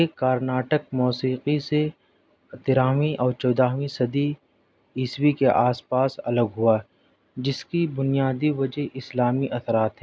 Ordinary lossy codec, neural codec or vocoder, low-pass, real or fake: none; none; none; real